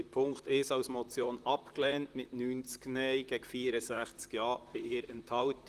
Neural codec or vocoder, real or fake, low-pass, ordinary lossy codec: vocoder, 44.1 kHz, 128 mel bands, Pupu-Vocoder; fake; 14.4 kHz; Opus, 24 kbps